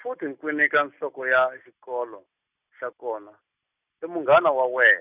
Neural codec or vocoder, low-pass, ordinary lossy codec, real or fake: none; 3.6 kHz; none; real